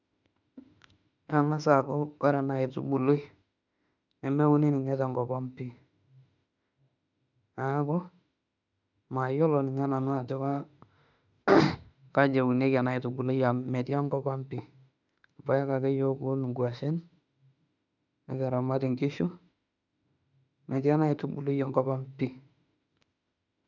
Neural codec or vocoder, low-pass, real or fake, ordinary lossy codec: autoencoder, 48 kHz, 32 numbers a frame, DAC-VAE, trained on Japanese speech; 7.2 kHz; fake; none